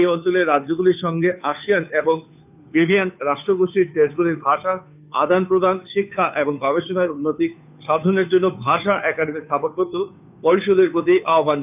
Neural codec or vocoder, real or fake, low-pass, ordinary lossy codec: codec, 16 kHz, 2 kbps, FunCodec, trained on Chinese and English, 25 frames a second; fake; 3.6 kHz; none